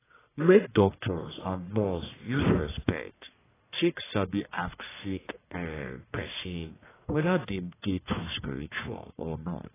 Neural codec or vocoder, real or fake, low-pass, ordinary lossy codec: codec, 44.1 kHz, 1.7 kbps, Pupu-Codec; fake; 3.6 kHz; AAC, 16 kbps